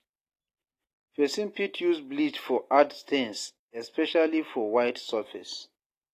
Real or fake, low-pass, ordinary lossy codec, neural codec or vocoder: real; 14.4 kHz; AAC, 48 kbps; none